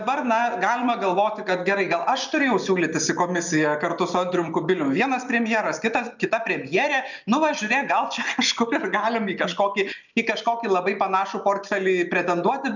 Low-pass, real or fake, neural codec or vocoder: 7.2 kHz; real; none